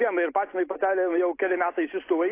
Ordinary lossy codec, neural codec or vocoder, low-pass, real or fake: AAC, 24 kbps; none; 3.6 kHz; real